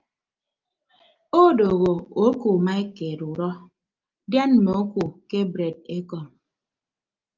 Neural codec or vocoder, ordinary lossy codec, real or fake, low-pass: none; Opus, 32 kbps; real; 7.2 kHz